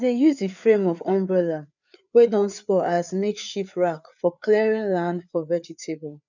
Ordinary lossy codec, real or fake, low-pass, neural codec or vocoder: none; fake; 7.2 kHz; codec, 16 kHz, 4 kbps, FreqCodec, larger model